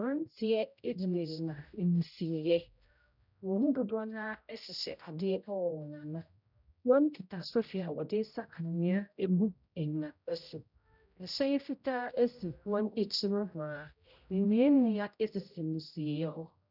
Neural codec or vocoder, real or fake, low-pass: codec, 16 kHz, 0.5 kbps, X-Codec, HuBERT features, trained on general audio; fake; 5.4 kHz